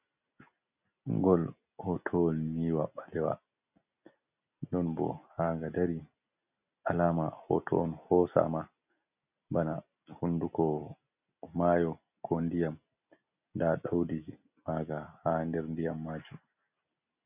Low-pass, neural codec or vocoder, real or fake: 3.6 kHz; none; real